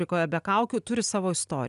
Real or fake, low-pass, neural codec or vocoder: real; 10.8 kHz; none